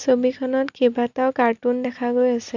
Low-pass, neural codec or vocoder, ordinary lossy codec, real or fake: 7.2 kHz; none; none; real